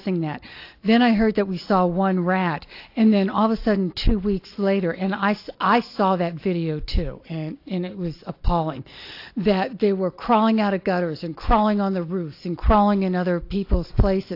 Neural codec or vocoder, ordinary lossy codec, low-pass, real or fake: none; AAC, 32 kbps; 5.4 kHz; real